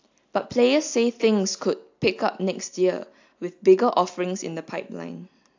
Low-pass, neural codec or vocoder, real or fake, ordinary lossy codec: 7.2 kHz; none; real; AAC, 48 kbps